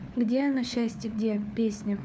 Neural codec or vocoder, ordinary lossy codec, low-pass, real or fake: codec, 16 kHz, 4 kbps, FunCodec, trained on LibriTTS, 50 frames a second; none; none; fake